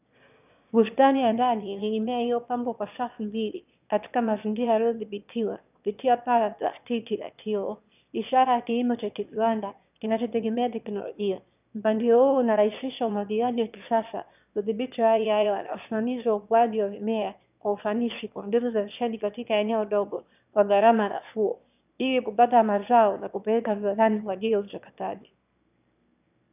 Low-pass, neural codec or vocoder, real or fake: 3.6 kHz; autoencoder, 22.05 kHz, a latent of 192 numbers a frame, VITS, trained on one speaker; fake